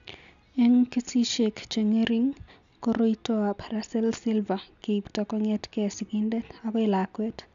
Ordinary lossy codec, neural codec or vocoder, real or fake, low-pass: none; none; real; 7.2 kHz